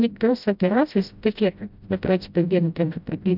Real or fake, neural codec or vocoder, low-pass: fake; codec, 16 kHz, 0.5 kbps, FreqCodec, smaller model; 5.4 kHz